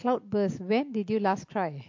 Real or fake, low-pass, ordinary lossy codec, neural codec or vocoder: real; 7.2 kHz; MP3, 48 kbps; none